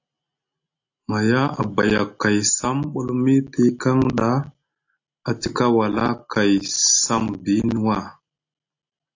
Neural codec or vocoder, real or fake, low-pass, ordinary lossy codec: none; real; 7.2 kHz; AAC, 48 kbps